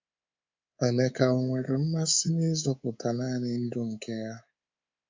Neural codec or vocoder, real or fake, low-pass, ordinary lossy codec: codec, 24 kHz, 3.1 kbps, DualCodec; fake; 7.2 kHz; MP3, 64 kbps